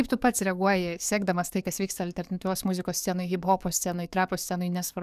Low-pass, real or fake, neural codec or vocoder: 14.4 kHz; fake; codec, 44.1 kHz, 7.8 kbps, DAC